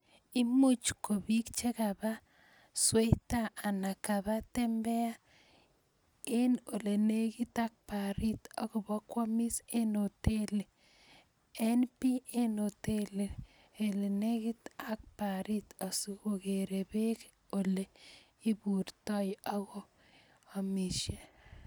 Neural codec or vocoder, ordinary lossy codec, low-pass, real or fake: none; none; none; real